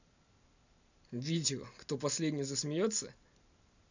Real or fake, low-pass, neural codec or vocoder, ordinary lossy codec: real; 7.2 kHz; none; none